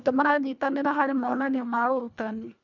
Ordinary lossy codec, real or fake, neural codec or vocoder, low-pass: none; fake; codec, 24 kHz, 1.5 kbps, HILCodec; 7.2 kHz